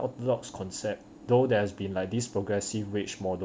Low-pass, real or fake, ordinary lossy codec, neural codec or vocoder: none; real; none; none